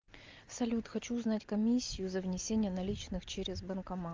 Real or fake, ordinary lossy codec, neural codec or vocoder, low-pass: real; Opus, 32 kbps; none; 7.2 kHz